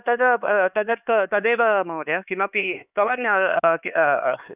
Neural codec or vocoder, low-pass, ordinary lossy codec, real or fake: codec, 16 kHz, 4 kbps, X-Codec, HuBERT features, trained on LibriSpeech; 3.6 kHz; none; fake